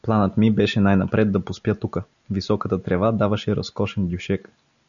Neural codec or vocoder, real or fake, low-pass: none; real; 7.2 kHz